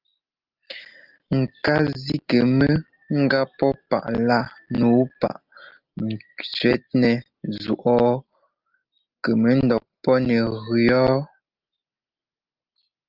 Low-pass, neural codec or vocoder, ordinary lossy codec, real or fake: 5.4 kHz; none; Opus, 32 kbps; real